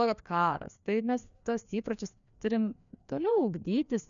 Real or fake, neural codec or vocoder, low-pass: fake; codec, 16 kHz, 2 kbps, FreqCodec, larger model; 7.2 kHz